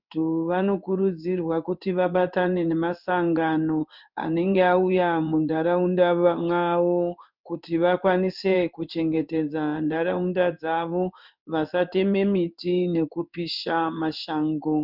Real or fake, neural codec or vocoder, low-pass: fake; codec, 16 kHz in and 24 kHz out, 1 kbps, XY-Tokenizer; 5.4 kHz